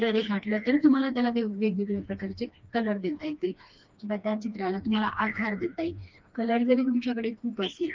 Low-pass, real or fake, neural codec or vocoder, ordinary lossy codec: 7.2 kHz; fake; codec, 16 kHz, 2 kbps, FreqCodec, smaller model; Opus, 24 kbps